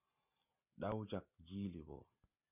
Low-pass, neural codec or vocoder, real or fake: 3.6 kHz; none; real